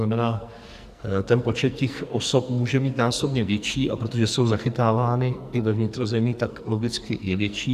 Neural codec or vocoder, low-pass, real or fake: codec, 44.1 kHz, 2.6 kbps, SNAC; 14.4 kHz; fake